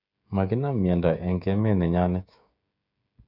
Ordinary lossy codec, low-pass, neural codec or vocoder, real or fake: none; 5.4 kHz; codec, 16 kHz, 16 kbps, FreqCodec, smaller model; fake